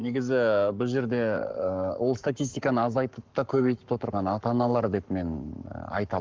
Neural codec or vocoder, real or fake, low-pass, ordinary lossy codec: codec, 44.1 kHz, 7.8 kbps, Pupu-Codec; fake; 7.2 kHz; Opus, 32 kbps